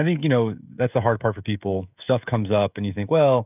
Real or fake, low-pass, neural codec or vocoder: fake; 3.6 kHz; codec, 16 kHz, 16 kbps, FreqCodec, smaller model